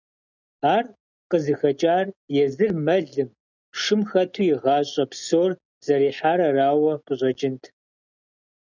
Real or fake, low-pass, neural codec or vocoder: real; 7.2 kHz; none